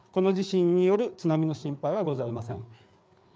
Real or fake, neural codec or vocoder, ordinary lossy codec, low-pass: fake; codec, 16 kHz, 4 kbps, FreqCodec, larger model; none; none